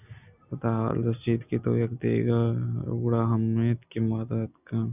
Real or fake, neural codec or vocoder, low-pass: real; none; 3.6 kHz